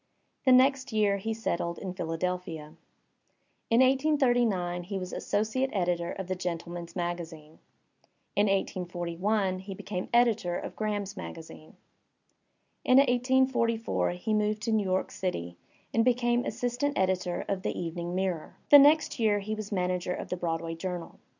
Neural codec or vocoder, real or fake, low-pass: none; real; 7.2 kHz